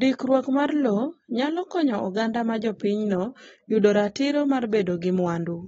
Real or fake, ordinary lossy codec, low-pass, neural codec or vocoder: real; AAC, 24 kbps; 19.8 kHz; none